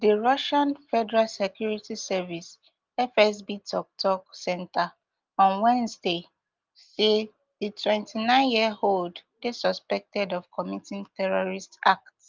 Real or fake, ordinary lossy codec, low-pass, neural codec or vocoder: real; Opus, 32 kbps; 7.2 kHz; none